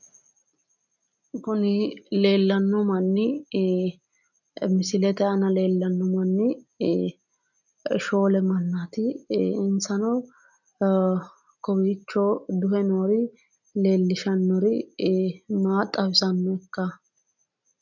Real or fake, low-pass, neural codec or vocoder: real; 7.2 kHz; none